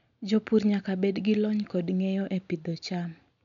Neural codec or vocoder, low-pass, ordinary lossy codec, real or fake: none; 7.2 kHz; none; real